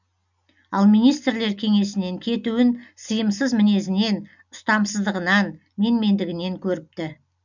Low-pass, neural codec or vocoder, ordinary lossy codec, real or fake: 7.2 kHz; none; none; real